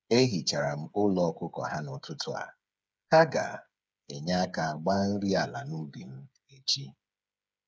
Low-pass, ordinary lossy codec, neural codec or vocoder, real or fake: none; none; codec, 16 kHz, 8 kbps, FreqCodec, smaller model; fake